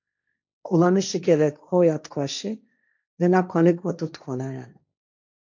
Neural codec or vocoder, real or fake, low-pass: codec, 16 kHz, 1.1 kbps, Voila-Tokenizer; fake; 7.2 kHz